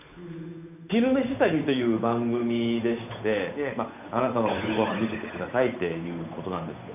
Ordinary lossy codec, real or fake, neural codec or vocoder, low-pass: none; fake; codec, 16 kHz, 8 kbps, FunCodec, trained on Chinese and English, 25 frames a second; 3.6 kHz